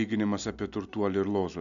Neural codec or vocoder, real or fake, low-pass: none; real; 7.2 kHz